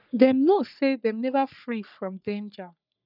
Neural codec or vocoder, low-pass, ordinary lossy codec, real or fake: codec, 44.1 kHz, 3.4 kbps, Pupu-Codec; 5.4 kHz; none; fake